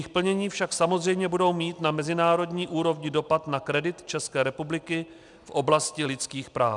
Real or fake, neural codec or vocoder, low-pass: real; none; 10.8 kHz